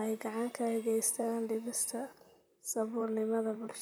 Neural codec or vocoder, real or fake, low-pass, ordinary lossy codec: vocoder, 44.1 kHz, 128 mel bands, Pupu-Vocoder; fake; none; none